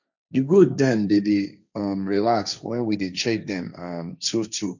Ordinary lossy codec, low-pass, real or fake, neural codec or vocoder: none; 7.2 kHz; fake; codec, 16 kHz, 1.1 kbps, Voila-Tokenizer